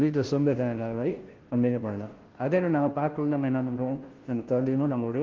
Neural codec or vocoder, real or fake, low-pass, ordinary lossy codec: codec, 16 kHz, 0.5 kbps, FunCodec, trained on Chinese and English, 25 frames a second; fake; 7.2 kHz; Opus, 16 kbps